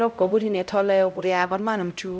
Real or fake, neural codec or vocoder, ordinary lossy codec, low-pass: fake; codec, 16 kHz, 0.5 kbps, X-Codec, HuBERT features, trained on LibriSpeech; none; none